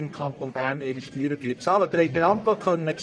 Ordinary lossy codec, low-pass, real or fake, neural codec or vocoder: none; 9.9 kHz; fake; codec, 44.1 kHz, 1.7 kbps, Pupu-Codec